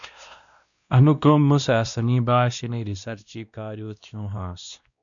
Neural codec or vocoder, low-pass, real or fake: codec, 16 kHz, 1 kbps, X-Codec, WavLM features, trained on Multilingual LibriSpeech; 7.2 kHz; fake